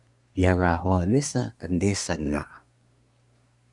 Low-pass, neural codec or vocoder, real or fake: 10.8 kHz; codec, 24 kHz, 1 kbps, SNAC; fake